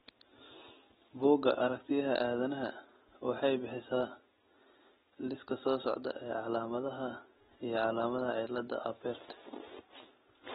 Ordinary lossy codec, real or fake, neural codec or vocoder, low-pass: AAC, 16 kbps; real; none; 10.8 kHz